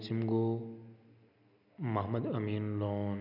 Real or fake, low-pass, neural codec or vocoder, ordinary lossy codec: real; 5.4 kHz; none; none